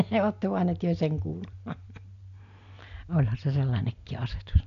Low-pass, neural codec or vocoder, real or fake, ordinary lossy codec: 7.2 kHz; none; real; AAC, 64 kbps